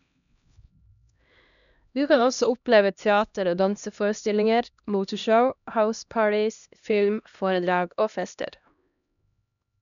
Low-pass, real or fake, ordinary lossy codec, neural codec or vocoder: 7.2 kHz; fake; none; codec, 16 kHz, 1 kbps, X-Codec, HuBERT features, trained on LibriSpeech